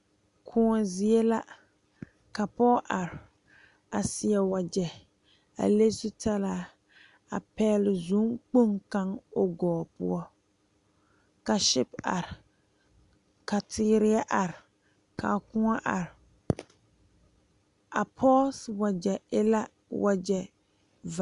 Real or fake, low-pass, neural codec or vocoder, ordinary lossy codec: real; 10.8 kHz; none; MP3, 96 kbps